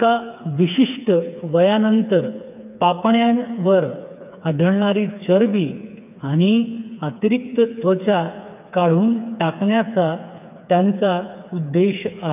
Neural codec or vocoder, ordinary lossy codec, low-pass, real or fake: codec, 16 kHz, 4 kbps, FreqCodec, smaller model; none; 3.6 kHz; fake